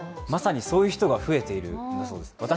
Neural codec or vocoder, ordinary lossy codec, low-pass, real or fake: none; none; none; real